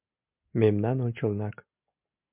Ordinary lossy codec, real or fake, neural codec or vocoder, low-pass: MP3, 32 kbps; real; none; 3.6 kHz